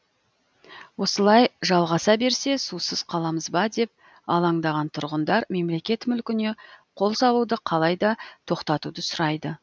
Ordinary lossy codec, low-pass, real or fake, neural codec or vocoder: none; none; real; none